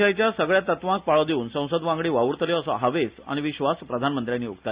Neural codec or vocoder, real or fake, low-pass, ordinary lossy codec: none; real; 3.6 kHz; Opus, 64 kbps